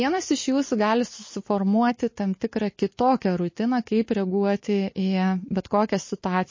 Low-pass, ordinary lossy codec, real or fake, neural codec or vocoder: 7.2 kHz; MP3, 32 kbps; real; none